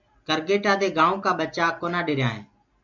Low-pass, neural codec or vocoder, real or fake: 7.2 kHz; none; real